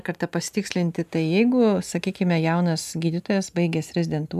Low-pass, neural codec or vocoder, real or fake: 14.4 kHz; none; real